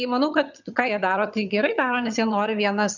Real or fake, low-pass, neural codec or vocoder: fake; 7.2 kHz; vocoder, 22.05 kHz, 80 mel bands, HiFi-GAN